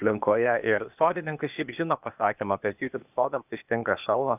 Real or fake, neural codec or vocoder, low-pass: fake; codec, 16 kHz, 0.8 kbps, ZipCodec; 3.6 kHz